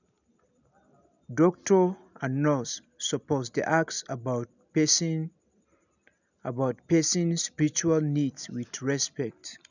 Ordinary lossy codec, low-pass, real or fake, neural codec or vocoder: none; 7.2 kHz; real; none